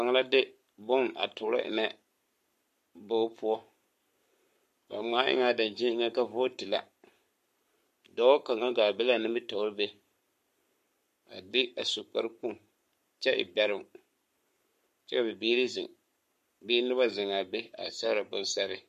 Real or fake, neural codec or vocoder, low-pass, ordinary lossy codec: fake; codec, 44.1 kHz, 7.8 kbps, Pupu-Codec; 14.4 kHz; MP3, 64 kbps